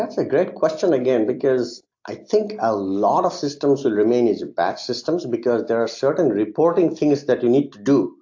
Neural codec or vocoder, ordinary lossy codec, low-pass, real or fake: none; AAC, 48 kbps; 7.2 kHz; real